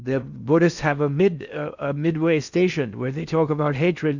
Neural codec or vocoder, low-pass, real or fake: codec, 16 kHz in and 24 kHz out, 0.6 kbps, FocalCodec, streaming, 4096 codes; 7.2 kHz; fake